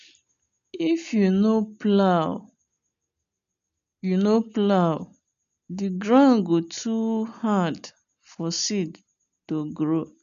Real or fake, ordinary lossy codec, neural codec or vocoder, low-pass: real; none; none; 7.2 kHz